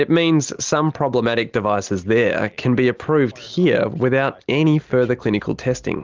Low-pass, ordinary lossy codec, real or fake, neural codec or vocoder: 7.2 kHz; Opus, 24 kbps; real; none